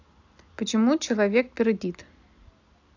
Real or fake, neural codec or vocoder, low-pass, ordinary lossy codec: real; none; 7.2 kHz; AAC, 48 kbps